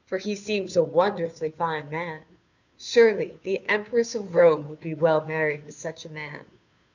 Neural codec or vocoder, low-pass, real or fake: codec, 16 kHz, 2 kbps, FunCodec, trained on Chinese and English, 25 frames a second; 7.2 kHz; fake